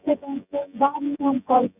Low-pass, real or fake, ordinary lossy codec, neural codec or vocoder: 3.6 kHz; fake; none; vocoder, 24 kHz, 100 mel bands, Vocos